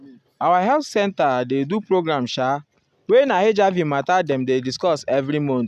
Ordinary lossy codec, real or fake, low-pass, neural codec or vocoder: none; real; 14.4 kHz; none